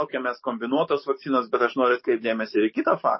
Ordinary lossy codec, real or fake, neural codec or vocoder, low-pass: MP3, 24 kbps; fake; codec, 44.1 kHz, 7.8 kbps, DAC; 7.2 kHz